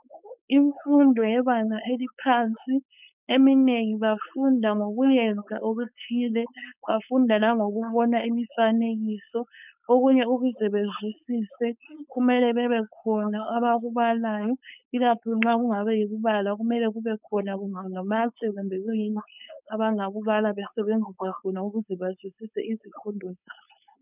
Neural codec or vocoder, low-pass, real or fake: codec, 16 kHz, 4.8 kbps, FACodec; 3.6 kHz; fake